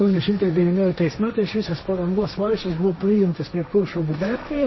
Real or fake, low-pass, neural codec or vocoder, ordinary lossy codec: fake; 7.2 kHz; codec, 16 kHz, 1.1 kbps, Voila-Tokenizer; MP3, 24 kbps